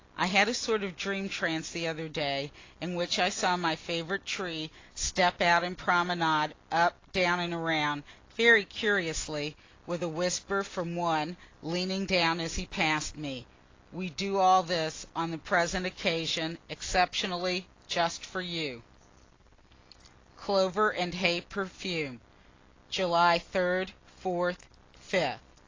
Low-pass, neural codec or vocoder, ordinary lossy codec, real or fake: 7.2 kHz; none; AAC, 32 kbps; real